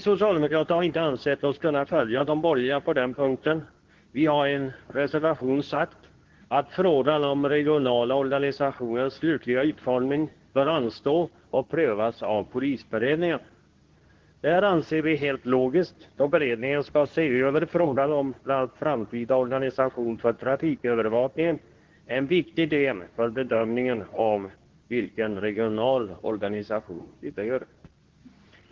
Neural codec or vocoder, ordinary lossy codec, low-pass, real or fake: codec, 24 kHz, 0.9 kbps, WavTokenizer, medium speech release version 2; Opus, 16 kbps; 7.2 kHz; fake